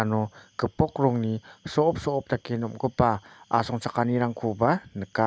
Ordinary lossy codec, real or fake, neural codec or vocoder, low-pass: none; real; none; none